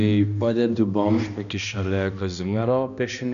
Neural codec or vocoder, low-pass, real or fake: codec, 16 kHz, 1 kbps, X-Codec, HuBERT features, trained on balanced general audio; 7.2 kHz; fake